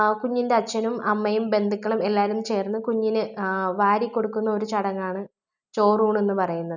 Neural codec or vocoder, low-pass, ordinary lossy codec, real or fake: none; 7.2 kHz; none; real